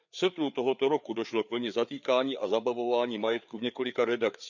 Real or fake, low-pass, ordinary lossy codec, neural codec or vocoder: fake; 7.2 kHz; none; codec, 16 kHz, 4 kbps, FreqCodec, larger model